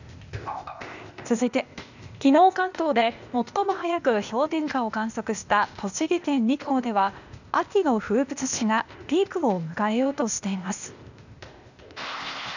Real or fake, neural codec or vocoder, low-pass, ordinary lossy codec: fake; codec, 16 kHz, 0.8 kbps, ZipCodec; 7.2 kHz; none